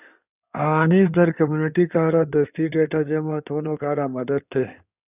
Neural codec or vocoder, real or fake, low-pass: codec, 16 kHz in and 24 kHz out, 2.2 kbps, FireRedTTS-2 codec; fake; 3.6 kHz